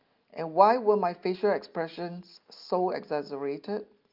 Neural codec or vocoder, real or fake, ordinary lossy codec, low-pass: none; real; Opus, 24 kbps; 5.4 kHz